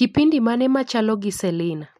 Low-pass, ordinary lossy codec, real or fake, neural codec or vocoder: 14.4 kHz; MP3, 64 kbps; real; none